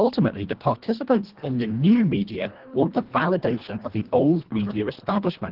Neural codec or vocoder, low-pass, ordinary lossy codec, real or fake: codec, 24 kHz, 1.5 kbps, HILCodec; 5.4 kHz; Opus, 16 kbps; fake